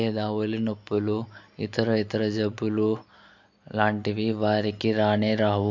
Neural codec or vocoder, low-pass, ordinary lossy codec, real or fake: none; 7.2 kHz; MP3, 48 kbps; real